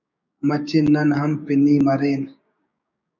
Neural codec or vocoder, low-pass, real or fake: codec, 16 kHz, 6 kbps, DAC; 7.2 kHz; fake